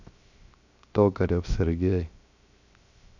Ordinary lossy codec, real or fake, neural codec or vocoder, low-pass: none; fake; codec, 16 kHz, 0.7 kbps, FocalCodec; 7.2 kHz